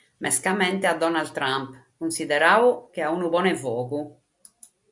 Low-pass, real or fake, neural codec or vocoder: 10.8 kHz; real; none